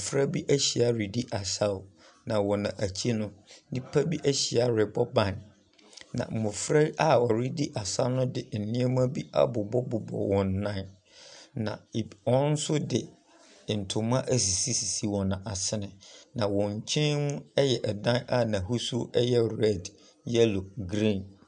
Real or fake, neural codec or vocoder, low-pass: real; none; 9.9 kHz